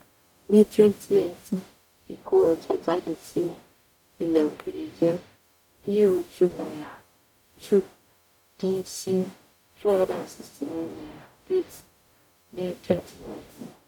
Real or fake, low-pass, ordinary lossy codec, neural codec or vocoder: fake; 19.8 kHz; none; codec, 44.1 kHz, 0.9 kbps, DAC